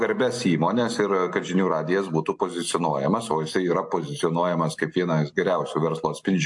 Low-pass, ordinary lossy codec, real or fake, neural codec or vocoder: 10.8 kHz; AAC, 64 kbps; real; none